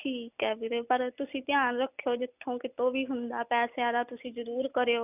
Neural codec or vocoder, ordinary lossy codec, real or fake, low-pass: none; none; real; 3.6 kHz